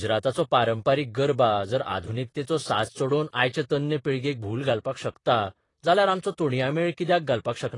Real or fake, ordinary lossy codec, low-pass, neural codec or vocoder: fake; AAC, 32 kbps; 10.8 kHz; vocoder, 44.1 kHz, 128 mel bands, Pupu-Vocoder